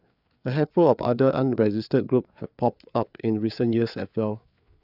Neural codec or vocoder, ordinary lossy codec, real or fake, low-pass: codec, 16 kHz, 4 kbps, FreqCodec, larger model; none; fake; 5.4 kHz